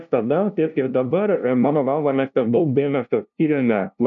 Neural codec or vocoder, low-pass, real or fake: codec, 16 kHz, 0.5 kbps, FunCodec, trained on LibriTTS, 25 frames a second; 7.2 kHz; fake